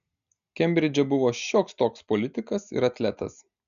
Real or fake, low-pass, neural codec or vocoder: real; 7.2 kHz; none